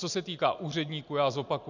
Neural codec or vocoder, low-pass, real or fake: none; 7.2 kHz; real